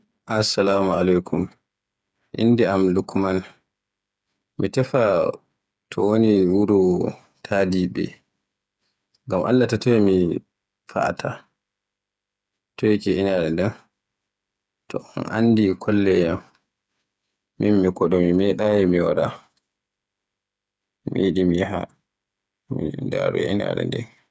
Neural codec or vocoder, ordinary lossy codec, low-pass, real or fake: codec, 16 kHz, 8 kbps, FreqCodec, smaller model; none; none; fake